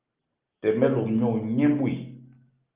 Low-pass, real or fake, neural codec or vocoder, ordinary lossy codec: 3.6 kHz; real; none; Opus, 32 kbps